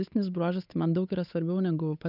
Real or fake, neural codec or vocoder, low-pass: fake; codec, 16 kHz, 8 kbps, FunCodec, trained on Chinese and English, 25 frames a second; 5.4 kHz